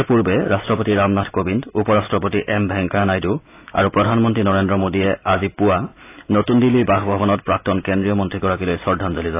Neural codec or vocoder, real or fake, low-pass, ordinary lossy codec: none; real; 3.6 kHz; AAC, 24 kbps